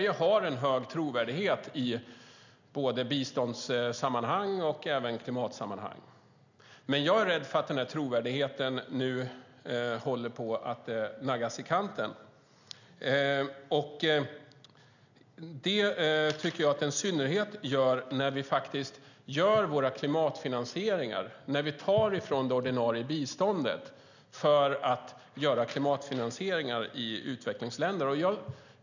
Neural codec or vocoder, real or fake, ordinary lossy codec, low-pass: none; real; none; 7.2 kHz